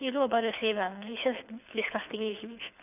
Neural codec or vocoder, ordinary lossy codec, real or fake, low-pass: codec, 24 kHz, 6 kbps, HILCodec; none; fake; 3.6 kHz